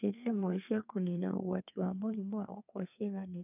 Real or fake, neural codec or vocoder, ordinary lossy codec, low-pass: fake; codec, 32 kHz, 1.9 kbps, SNAC; none; 3.6 kHz